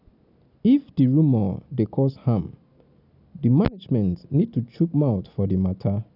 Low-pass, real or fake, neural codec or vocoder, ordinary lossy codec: 5.4 kHz; real; none; none